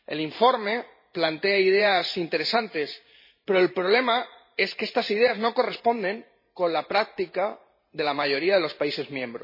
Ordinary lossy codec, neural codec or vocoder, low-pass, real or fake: MP3, 24 kbps; vocoder, 44.1 kHz, 128 mel bands every 512 samples, BigVGAN v2; 5.4 kHz; fake